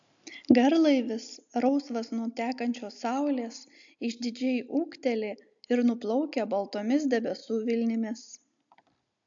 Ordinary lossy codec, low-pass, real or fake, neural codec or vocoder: AAC, 64 kbps; 7.2 kHz; real; none